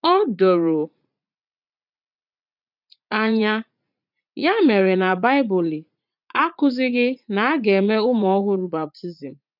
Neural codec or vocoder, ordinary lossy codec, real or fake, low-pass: vocoder, 44.1 kHz, 80 mel bands, Vocos; none; fake; 5.4 kHz